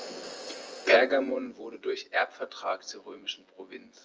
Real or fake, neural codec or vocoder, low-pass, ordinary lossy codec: fake; vocoder, 24 kHz, 100 mel bands, Vocos; 7.2 kHz; Opus, 24 kbps